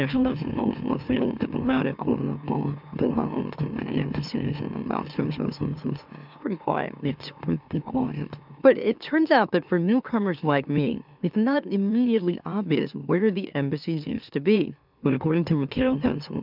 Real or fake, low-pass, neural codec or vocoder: fake; 5.4 kHz; autoencoder, 44.1 kHz, a latent of 192 numbers a frame, MeloTTS